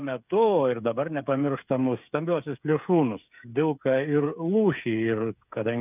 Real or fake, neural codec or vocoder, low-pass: fake; codec, 16 kHz, 8 kbps, FreqCodec, smaller model; 3.6 kHz